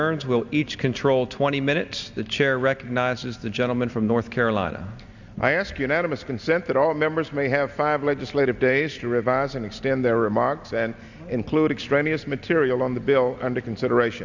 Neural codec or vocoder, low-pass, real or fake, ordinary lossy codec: none; 7.2 kHz; real; Opus, 64 kbps